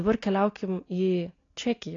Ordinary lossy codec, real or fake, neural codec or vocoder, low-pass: AAC, 32 kbps; real; none; 7.2 kHz